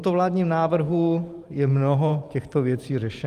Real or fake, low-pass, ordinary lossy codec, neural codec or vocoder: fake; 14.4 kHz; Opus, 32 kbps; vocoder, 44.1 kHz, 128 mel bands every 512 samples, BigVGAN v2